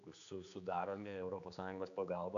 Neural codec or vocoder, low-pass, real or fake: codec, 16 kHz, 4 kbps, X-Codec, HuBERT features, trained on general audio; 7.2 kHz; fake